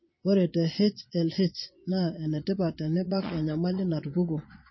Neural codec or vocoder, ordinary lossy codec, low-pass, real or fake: vocoder, 22.05 kHz, 80 mel bands, Vocos; MP3, 24 kbps; 7.2 kHz; fake